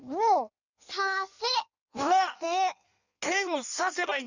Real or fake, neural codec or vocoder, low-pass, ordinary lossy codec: fake; codec, 16 kHz in and 24 kHz out, 1.1 kbps, FireRedTTS-2 codec; 7.2 kHz; none